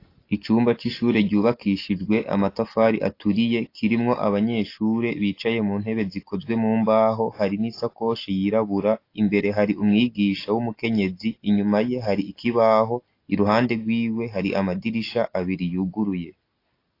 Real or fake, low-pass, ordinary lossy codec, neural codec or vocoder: real; 5.4 kHz; AAC, 32 kbps; none